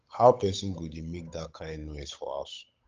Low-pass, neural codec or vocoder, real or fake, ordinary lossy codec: 7.2 kHz; codec, 16 kHz, 8 kbps, FunCodec, trained on Chinese and English, 25 frames a second; fake; Opus, 24 kbps